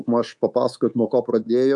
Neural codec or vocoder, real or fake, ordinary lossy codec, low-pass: codec, 24 kHz, 3.1 kbps, DualCodec; fake; MP3, 96 kbps; 10.8 kHz